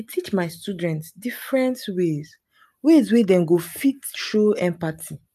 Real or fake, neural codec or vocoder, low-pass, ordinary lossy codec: real; none; 14.4 kHz; AAC, 96 kbps